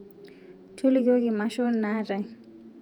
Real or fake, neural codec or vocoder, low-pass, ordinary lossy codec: fake; vocoder, 48 kHz, 128 mel bands, Vocos; 19.8 kHz; none